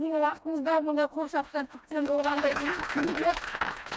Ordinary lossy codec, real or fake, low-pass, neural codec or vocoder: none; fake; none; codec, 16 kHz, 1 kbps, FreqCodec, smaller model